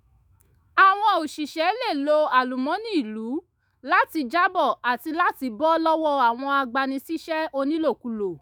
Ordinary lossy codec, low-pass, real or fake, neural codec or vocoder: none; none; fake; autoencoder, 48 kHz, 128 numbers a frame, DAC-VAE, trained on Japanese speech